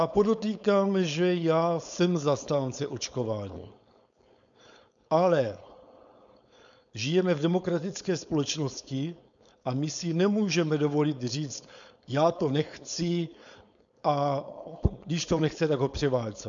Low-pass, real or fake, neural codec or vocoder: 7.2 kHz; fake; codec, 16 kHz, 4.8 kbps, FACodec